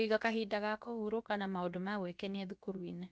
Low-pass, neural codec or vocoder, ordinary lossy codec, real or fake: none; codec, 16 kHz, about 1 kbps, DyCAST, with the encoder's durations; none; fake